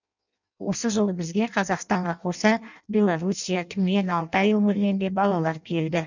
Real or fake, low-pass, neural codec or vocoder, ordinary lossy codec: fake; 7.2 kHz; codec, 16 kHz in and 24 kHz out, 0.6 kbps, FireRedTTS-2 codec; none